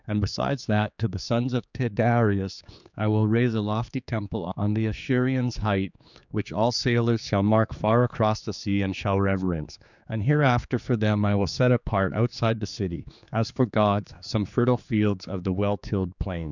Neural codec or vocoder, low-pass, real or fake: codec, 16 kHz, 4 kbps, X-Codec, HuBERT features, trained on general audio; 7.2 kHz; fake